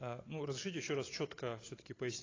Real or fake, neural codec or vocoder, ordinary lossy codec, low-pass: real; none; AAC, 32 kbps; 7.2 kHz